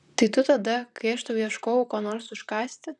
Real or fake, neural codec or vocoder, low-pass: fake; vocoder, 44.1 kHz, 128 mel bands, Pupu-Vocoder; 10.8 kHz